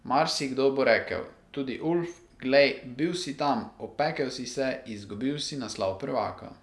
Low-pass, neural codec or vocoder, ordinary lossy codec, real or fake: none; none; none; real